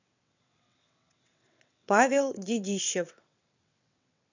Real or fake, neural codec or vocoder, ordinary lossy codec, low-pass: fake; vocoder, 22.05 kHz, 80 mel bands, WaveNeXt; none; 7.2 kHz